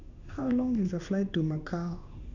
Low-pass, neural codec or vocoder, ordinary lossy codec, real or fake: 7.2 kHz; codec, 16 kHz in and 24 kHz out, 1 kbps, XY-Tokenizer; none; fake